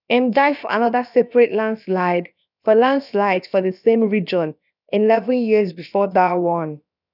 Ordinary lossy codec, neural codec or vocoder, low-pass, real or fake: none; codec, 16 kHz, about 1 kbps, DyCAST, with the encoder's durations; 5.4 kHz; fake